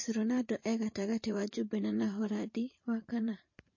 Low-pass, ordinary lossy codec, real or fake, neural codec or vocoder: 7.2 kHz; MP3, 32 kbps; fake; vocoder, 44.1 kHz, 128 mel bands every 512 samples, BigVGAN v2